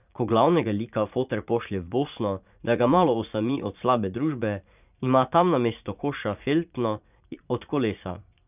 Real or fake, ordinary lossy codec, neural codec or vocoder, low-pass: fake; none; vocoder, 44.1 kHz, 80 mel bands, Vocos; 3.6 kHz